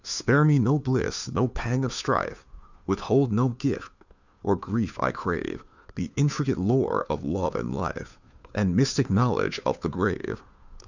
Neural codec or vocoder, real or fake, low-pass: codec, 16 kHz, 2 kbps, FunCodec, trained on Chinese and English, 25 frames a second; fake; 7.2 kHz